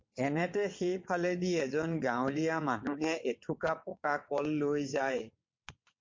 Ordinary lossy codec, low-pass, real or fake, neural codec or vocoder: MP3, 48 kbps; 7.2 kHz; fake; vocoder, 22.05 kHz, 80 mel bands, WaveNeXt